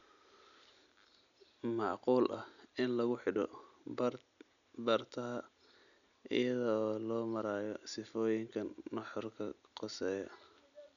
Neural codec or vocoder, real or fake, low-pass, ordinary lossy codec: none; real; 7.2 kHz; none